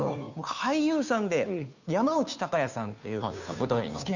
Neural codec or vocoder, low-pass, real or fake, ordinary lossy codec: codec, 16 kHz, 2 kbps, FunCodec, trained on Chinese and English, 25 frames a second; 7.2 kHz; fake; none